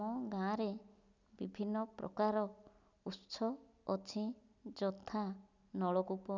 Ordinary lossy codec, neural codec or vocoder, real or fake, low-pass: none; none; real; 7.2 kHz